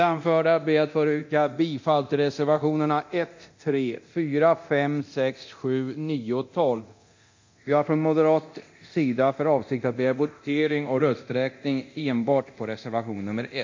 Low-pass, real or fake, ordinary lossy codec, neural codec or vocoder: 7.2 kHz; fake; MP3, 48 kbps; codec, 24 kHz, 0.9 kbps, DualCodec